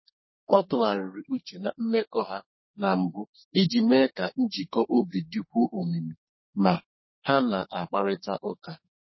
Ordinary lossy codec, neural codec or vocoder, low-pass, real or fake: MP3, 24 kbps; codec, 32 kHz, 1.9 kbps, SNAC; 7.2 kHz; fake